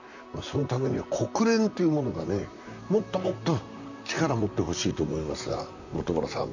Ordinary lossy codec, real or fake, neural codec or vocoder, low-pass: none; fake; vocoder, 44.1 kHz, 128 mel bands, Pupu-Vocoder; 7.2 kHz